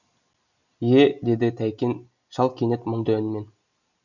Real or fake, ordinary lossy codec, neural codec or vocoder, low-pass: real; none; none; 7.2 kHz